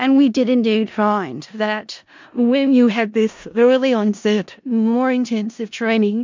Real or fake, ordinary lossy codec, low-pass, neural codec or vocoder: fake; MP3, 64 kbps; 7.2 kHz; codec, 16 kHz in and 24 kHz out, 0.4 kbps, LongCat-Audio-Codec, four codebook decoder